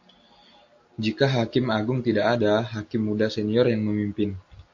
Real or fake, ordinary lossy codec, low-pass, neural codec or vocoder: real; AAC, 48 kbps; 7.2 kHz; none